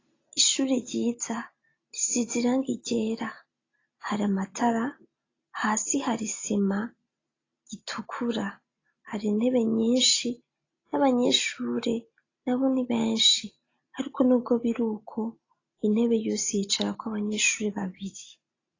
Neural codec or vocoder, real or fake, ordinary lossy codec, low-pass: none; real; AAC, 32 kbps; 7.2 kHz